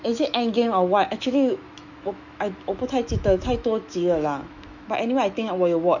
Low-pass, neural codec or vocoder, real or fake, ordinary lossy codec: 7.2 kHz; autoencoder, 48 kHz, 128 numbers a frame, DAC-VAE, trained on Japanese speech; fake; none